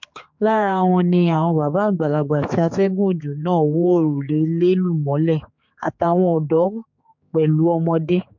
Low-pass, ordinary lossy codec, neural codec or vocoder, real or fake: 7.2 kHz; MP3, 48 kbps; codec, 16 kHz, 4 kbps, X-Codec, HuBERT features, trained on general audio; fake